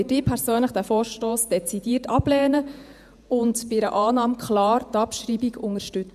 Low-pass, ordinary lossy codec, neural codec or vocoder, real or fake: 14.4 kHz; none; vocoder, 48 kHz, 128 mel bands, Vocos; fake